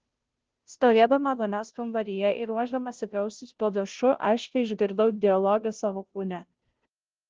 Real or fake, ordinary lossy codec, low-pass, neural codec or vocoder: fake; Opus, 16 kbps; 7.2 kHz; codec, 16 kHz, 0.5 kbps, FunCodec, trained on Chinese and English, 25 frames a second